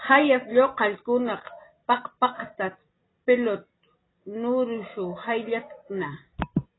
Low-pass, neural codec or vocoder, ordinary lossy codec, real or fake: 7.2 kHz; none; AAC, 16 kbps; real